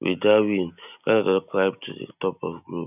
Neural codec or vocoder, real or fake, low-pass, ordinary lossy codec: none; real; 3.6 kHz; none